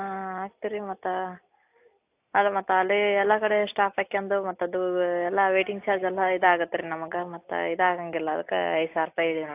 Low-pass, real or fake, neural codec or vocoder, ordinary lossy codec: 3.6 kHz; real; none; AAC, 32 kbps